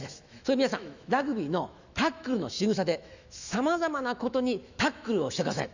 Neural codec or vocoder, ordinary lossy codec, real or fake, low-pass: autoencoder, 48 kHz, 128 numbers a frame, DAC-VAE, trained on Japanese speech; none; fake; 7.2 kHz